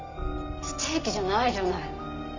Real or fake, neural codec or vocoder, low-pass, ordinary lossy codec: real; none; 7.2 kHz; none